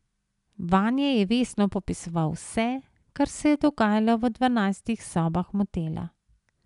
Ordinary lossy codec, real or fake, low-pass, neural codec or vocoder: none; real; 10.8 kHz; none